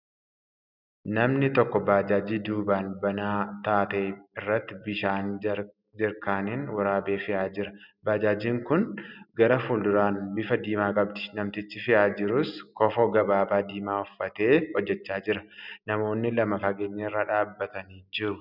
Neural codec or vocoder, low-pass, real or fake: none; 5.4 kHz; real